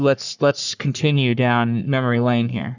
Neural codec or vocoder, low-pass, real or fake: codec, 44.1 kHz, 3.4 kbps, Pupu-Codec; 7.2 kHz; fake